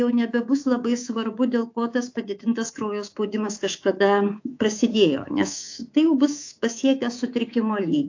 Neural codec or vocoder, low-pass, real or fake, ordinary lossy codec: codec, 24 kHz, 3.1 kbps, DualCodec; 7.2 kHz; fake; AAC, 48 kbps